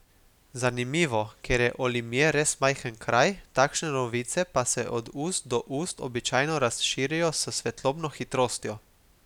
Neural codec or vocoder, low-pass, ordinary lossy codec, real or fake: none; 19.8 kHz; none; real